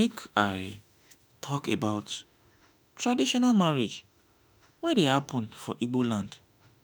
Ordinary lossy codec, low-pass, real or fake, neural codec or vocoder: none; none; fake; autoencoder, 48 kHz, 32 numbers a frame, DAC-VAE, trained on Japanese speech